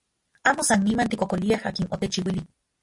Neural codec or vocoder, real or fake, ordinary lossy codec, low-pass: none; real; MP3, 48 kbps; 10.8 kHz